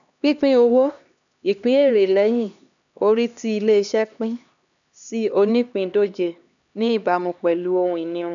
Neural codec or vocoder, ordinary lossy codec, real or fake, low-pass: codec, 16 kHz, 2 kbps, X-Codec, HuBERT features, trained on LibriSpeech; none; fake; 7.2 kHz